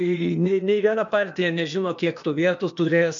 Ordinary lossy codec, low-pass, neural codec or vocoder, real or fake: MP3, 64 kbps; 7.2 kHz; codec, 16 kHz, 0.8 kbps, ZipCodec; fake